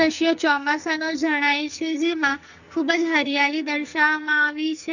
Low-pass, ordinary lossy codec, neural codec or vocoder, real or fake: 7.2 kHz; none; codec, 44.1 kHz, 2.6 kbps, SNAC; fake